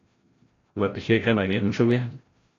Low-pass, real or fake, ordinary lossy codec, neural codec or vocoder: 7.2 kHz; fake; AAC, 32 kbps; codec, 16 kHz, 0.5 kbps, FreqCodec, larger model